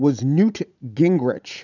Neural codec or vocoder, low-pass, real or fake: vocoder, 44.1 kHz, 80 mel bands, Vocos; 7.2 kHz; fake